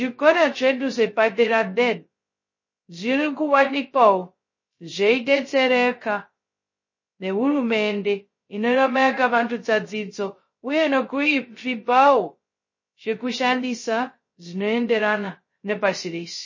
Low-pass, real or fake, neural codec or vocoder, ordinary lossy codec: 7.2 kHz; fake; codec, 16 kHz, 0.2 kbps, FocalCodec; MP3, 32 kbps